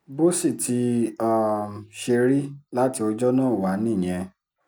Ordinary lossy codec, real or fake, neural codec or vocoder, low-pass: none; real; none; none